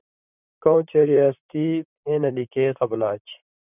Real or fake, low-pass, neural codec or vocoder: fake; 3.6 kHz; vocoder, 44.1 kHz, 128 mel bands, Pupu-Vocoder